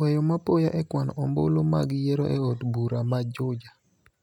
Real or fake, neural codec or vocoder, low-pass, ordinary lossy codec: real; none; 19.8 kHz; none